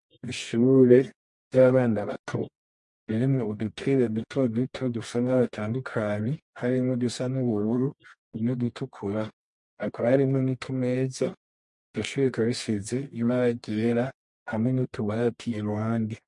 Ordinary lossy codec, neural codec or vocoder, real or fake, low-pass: MP3, 48 kbps; codec, 24 kHz, 0.9 kbps, WavTokenizer, medium music audio release; fake; 10.8 kHz